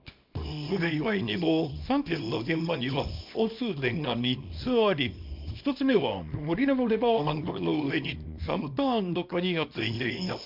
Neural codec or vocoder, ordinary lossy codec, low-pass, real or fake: codec, 24 kHz, 0.9 kbps, WavTokenizer, small release; MP3, 48 kbps; 5.4 kHz; fake